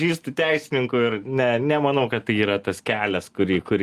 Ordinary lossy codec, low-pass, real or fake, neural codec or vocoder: Opus, 32 kbps; 14.4 kHz; real; none